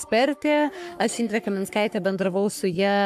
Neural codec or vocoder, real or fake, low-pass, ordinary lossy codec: codec, 44.1 kHz, 3.4 kbps, Pupu-Codec; fake; 14.4 kHz; AAC, 96 kbps